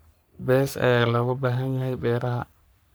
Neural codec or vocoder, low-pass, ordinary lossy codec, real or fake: codec, 44.1 kHz, 3.4 kbps, Pupu-Codec; none; none; fake